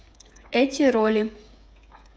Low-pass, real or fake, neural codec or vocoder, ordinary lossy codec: none; fake; codec, 16 kHz, 8 kbps, FreqCodec, smaller model; none